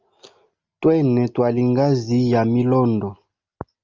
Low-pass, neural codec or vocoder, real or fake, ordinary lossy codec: 7.2 kHz; none; real; Opus, 24 kbps